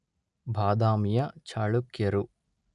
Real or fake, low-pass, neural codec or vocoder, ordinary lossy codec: real; 10.8 kHz; none; none